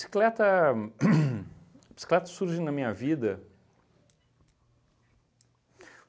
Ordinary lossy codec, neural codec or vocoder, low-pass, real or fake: none; none; none; real